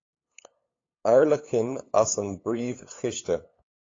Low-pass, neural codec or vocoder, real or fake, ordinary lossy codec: 7.2 kHz; codec, 16 kHz, 8 kbps, FunCodec, trained on LibriTTS, 25 frames a second; fake; AAC, 32 kbps